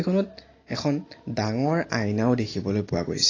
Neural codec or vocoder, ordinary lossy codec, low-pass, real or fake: none; AAC, 32 kbps; 7.2 kHz; real